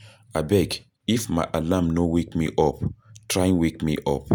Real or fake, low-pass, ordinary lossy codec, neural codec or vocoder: real; 19.8 kHz; none; none